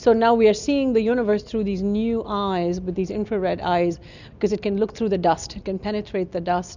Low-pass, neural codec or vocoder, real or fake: 7.2 kHz; none; real